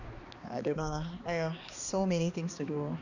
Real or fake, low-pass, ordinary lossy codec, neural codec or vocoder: fake; 7.2 kHz; none; codec, 16 kHz, 2 kbps, X-Codec, HuBERT features, trained on balanced general audio